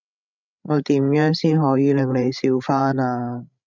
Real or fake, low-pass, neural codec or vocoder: fake; 7.2 kHz; codec, 16 kHz, 16 kbps, FreqCodec, larger model